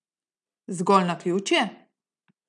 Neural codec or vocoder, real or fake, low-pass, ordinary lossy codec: none; real; 9.9 kHz; none